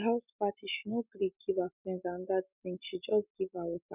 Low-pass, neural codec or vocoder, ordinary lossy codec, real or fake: 3.6 kHz; none; none; real